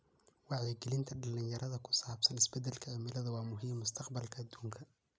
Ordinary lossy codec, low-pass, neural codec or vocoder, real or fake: none; none; none; real